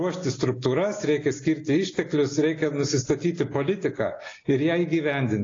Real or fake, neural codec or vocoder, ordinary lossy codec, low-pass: real; none; AAC, 32 kbps; 7.2 kHz